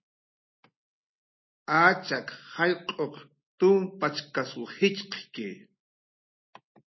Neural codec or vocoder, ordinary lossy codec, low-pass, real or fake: none; MP3, 24 kbps; 7.2 kHz; real